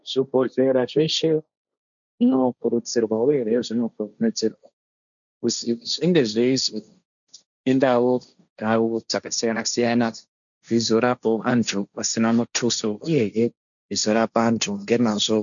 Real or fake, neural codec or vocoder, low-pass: fake; codec, 16 kHz, 1.1 kbps, Voila-Tokenizer; 7.2 kHz